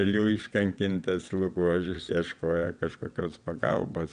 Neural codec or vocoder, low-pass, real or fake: vocoder, 22.05 kHz, 80 mel bands, WaveNeXt; 9.9 kHz; fake